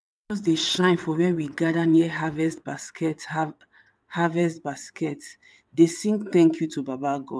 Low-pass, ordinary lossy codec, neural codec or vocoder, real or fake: none; none; vocoder, 22.05 kHz, 80 mel bands, WaveNeXt; fake